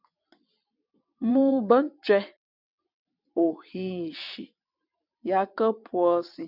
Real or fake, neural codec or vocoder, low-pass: fake; vocoder, 22.05 kHz, 80 mel bands, WaveNeXt; 5.4 kHz